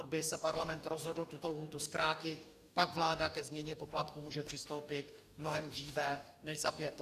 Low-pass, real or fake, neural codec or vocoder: 14.4 kHz; fake; codec, 44.1 kHz, 2.6 kbps, DAC